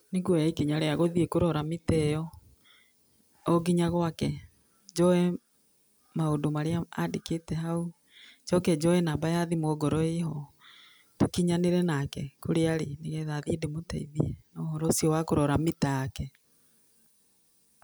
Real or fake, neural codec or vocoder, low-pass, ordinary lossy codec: real; none; none; none